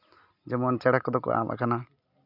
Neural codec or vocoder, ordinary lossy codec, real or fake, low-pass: none; none; real; 5.4 kHz